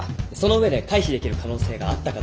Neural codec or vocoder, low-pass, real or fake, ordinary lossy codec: none; none; real; none